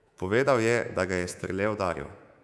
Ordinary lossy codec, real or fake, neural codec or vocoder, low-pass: none; fake; codec, 24 kHz, 3.1 kbps, DualCodec; none